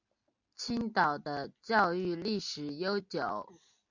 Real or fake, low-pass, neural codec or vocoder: real; 7.2 kHz; none